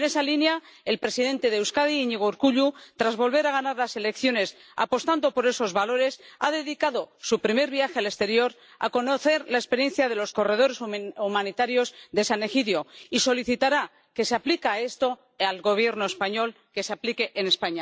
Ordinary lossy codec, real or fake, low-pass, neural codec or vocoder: none; real; none; none